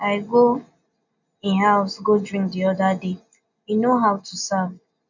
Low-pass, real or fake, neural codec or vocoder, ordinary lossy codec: 7.2 kHz; real; none; none